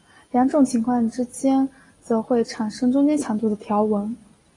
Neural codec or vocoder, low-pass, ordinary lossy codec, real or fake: none; 10.8 kHz; AAC, 32 kbps; real